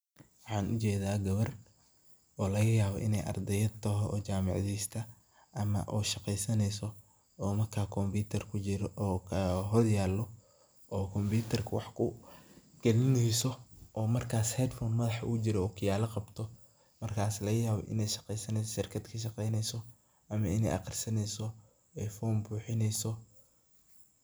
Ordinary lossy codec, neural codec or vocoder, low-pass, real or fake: none; none; none; real